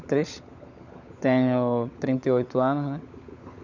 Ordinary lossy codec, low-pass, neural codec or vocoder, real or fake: none; 7.2 kHz; codec, 16 kHz, 16 kbps, FunCodec, trained on LibriTTS, 50 frames a second; fake